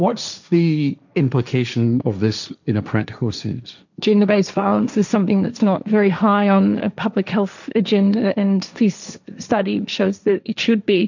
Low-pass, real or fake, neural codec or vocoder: 7.2 kHz; fake; codec, 16 kHz, 1.1 kbps, Voila-Tokenizer